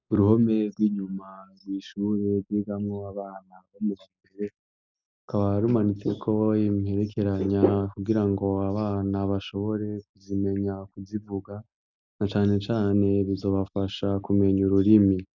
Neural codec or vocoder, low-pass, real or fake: none; 7.2 kHz; real